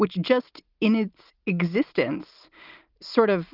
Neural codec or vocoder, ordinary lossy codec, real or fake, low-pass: none; Opus, 32 kbps; real; 5.4 kHz